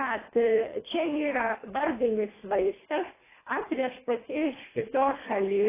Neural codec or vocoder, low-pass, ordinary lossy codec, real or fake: codec, 24 kHz, 1.5 kbps, HILCodec; 3.6 kHz; AAC, 16 kbps; fake